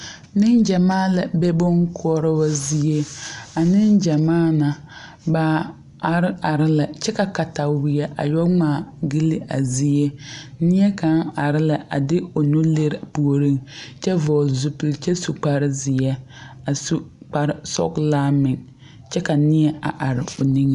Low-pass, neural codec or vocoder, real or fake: 10.8 kHz; none; real